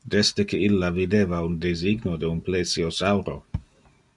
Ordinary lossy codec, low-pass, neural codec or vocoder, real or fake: Opus, 64 kbps; 10.8 kHz; none; real